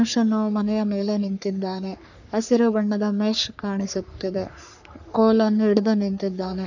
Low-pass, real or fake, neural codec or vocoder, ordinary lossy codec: 7.2 kHz; fake; codec, 44.1 kHz, 3.4 kbps, Pupu-Codec; none